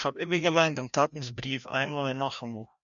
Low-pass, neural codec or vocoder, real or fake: 7.2 kHz; codec, 16 kHz, 1 kbps, FreqCodec, larger model; fake